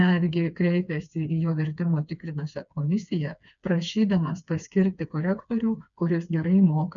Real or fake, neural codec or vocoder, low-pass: fake; codec, 16 kHz, 4 kbps, FreqCodec, smaller model; 7.2 kHz